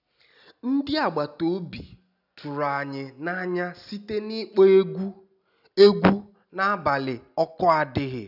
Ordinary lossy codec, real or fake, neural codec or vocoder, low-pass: none; real; none; 5.4 kHz